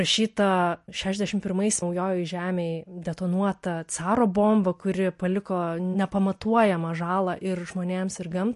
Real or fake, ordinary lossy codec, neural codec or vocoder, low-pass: real; MP3, 48 kbps; none; 14.4 kHz